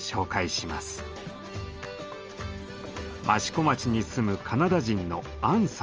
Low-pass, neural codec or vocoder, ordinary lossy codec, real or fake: 7.2 kHz; none; Opus, 24 kbps; real